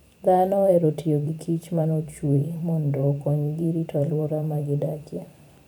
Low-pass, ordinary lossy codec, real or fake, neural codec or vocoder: none; none; fake; vocoder, 44.1 kHz, 128 mel bands every 512 samples, BigVGAN v2